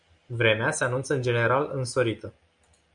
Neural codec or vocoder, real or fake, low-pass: none; real; 9.9 kHz